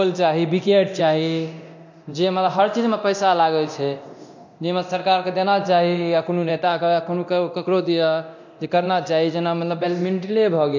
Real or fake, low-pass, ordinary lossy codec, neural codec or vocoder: fake; 7.2 kHz; MP3, 48 kbps; codec, 24 kHz, 0.9 kbps, DualCodec